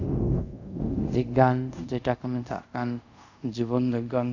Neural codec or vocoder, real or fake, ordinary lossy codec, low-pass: codec, 24 kHz, 0.5 kbps, DualCodec; fake; none; 7.2 kHz